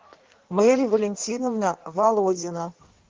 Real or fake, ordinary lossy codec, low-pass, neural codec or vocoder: fake; Opus, 16 kbps; 7.2 kHz; codec, 16 kHz in and 24 kHz out, 1.1 kbps, FireRedTTS-2 codec